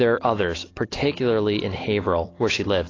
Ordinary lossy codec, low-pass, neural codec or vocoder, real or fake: AAC, 32 kbps; 7.2 kHz; none; real